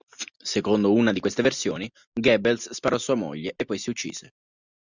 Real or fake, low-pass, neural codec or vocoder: real; 7.2 kHz; none